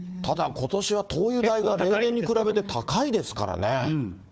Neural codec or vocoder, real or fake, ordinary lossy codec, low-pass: codec, 16 kHz, 4 kbps, FunCodec, trained on Chinese and English, 50 frames a second; fake; none; none